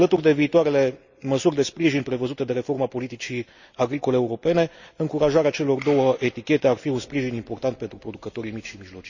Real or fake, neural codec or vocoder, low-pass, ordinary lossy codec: real; none; 7.2 kHz; Opus, 64 kbps